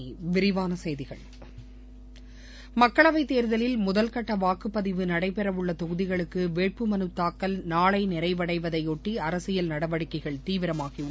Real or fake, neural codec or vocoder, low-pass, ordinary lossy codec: real; none; none; none